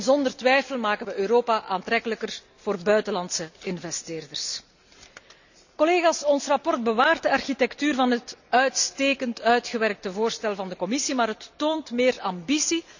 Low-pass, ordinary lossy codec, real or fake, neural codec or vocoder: 7.2 kHz; none; real; none